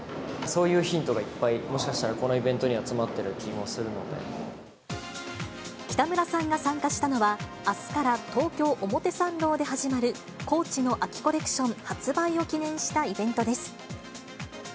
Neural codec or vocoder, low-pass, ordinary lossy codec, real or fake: none; none; none; real